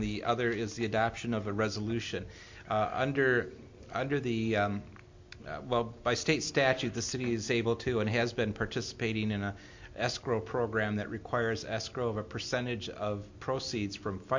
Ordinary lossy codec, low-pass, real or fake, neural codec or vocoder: MP3, 48 kbps; 7.2 kHz; real; none